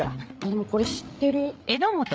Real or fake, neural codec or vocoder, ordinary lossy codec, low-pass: fake; codec, 16 kHz, 8 kbps, FreqCodec, larger model; none; none